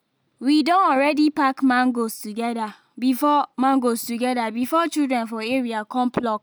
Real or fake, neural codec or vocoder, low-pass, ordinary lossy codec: real; none; none; none